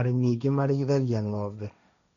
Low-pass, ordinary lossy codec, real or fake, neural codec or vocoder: 7.2 kHz; none; fake; codec, 16 kHz, 1.1 kbps, Voila-Tokenizer